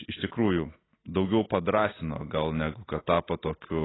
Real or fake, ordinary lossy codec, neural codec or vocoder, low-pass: real; AAC, 16 kbps; none; 7.2 kHz